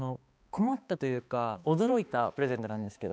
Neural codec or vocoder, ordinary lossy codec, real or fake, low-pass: codec, 16 kHz, 2 kbps, X-Codec, HuBERT features, trained on balanced general audio; none; fake; none